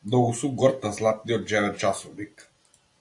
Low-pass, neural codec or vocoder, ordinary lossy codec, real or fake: 10.8 kHz; none; AAC, 64 kbps; real